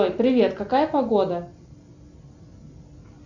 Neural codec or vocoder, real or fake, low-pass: none; real; 7.2 kHz